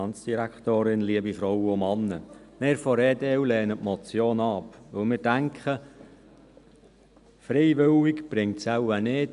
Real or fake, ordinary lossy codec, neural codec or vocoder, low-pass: real; none; none; 10.8 kHz